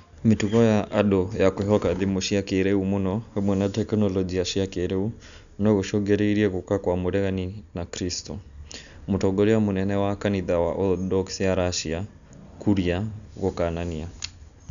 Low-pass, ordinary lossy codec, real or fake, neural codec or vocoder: 7.2 kHz; none; real; none